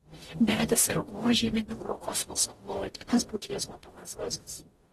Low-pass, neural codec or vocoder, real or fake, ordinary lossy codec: 19.8 kHz; codec, 44.1 kHz, 0.9 kbps, DAC; fake; AAC, 32 kbps